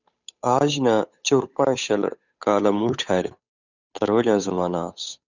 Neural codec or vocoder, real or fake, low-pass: codec, 16 kHz, 8 kbps, FunCodec, trained on Chinese and English, 25 frames a second; fake; 7.2 kHz